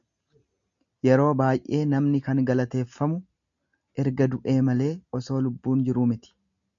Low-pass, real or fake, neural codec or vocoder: 7.2 kHz; real; none